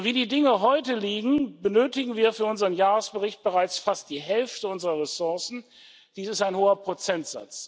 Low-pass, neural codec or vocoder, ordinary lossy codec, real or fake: none; none; none; real